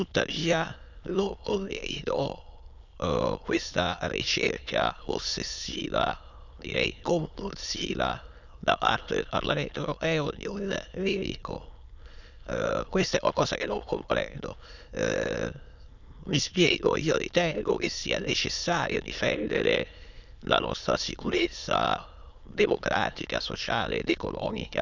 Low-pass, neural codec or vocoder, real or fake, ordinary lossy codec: 7.2 kHz; autoencoder, 22.05 kHz, a latent of 192 numbers a frame, VITS, trained on many speakers; fake; none